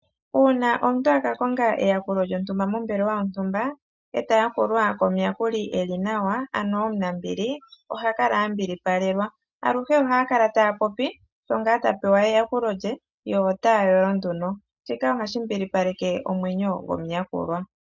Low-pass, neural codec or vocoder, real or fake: 7.2 kHz; none; real